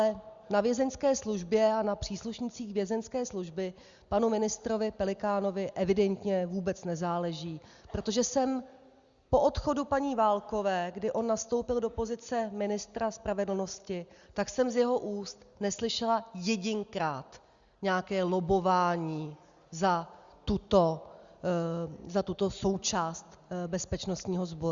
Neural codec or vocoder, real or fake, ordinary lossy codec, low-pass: none; real; Opus, 64 kbps; 7.2 kHz